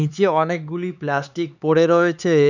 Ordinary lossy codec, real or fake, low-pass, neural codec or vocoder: none; fake; 7.2 kHz; codec, 16 kHz, 4 kbps, X-Codec, HuBERT features, trained on LibriSpeech